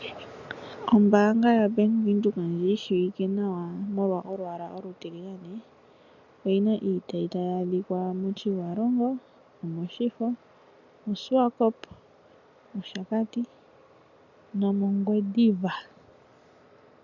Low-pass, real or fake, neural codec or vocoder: 7.2 kHz; real; none